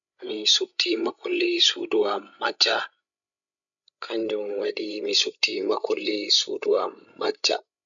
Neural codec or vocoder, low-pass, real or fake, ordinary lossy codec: codec, 16 kHz, 16 kbps, FreqCodec, larger model; 7.2 kHz; fake; none